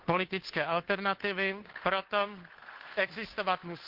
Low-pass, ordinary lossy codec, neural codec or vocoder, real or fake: 5.4 kHz; Opus, 16 kbps; codec, 16 kHz, 2 kbps, FunCodec, trained on Chinese and English, 25 frames a second; fake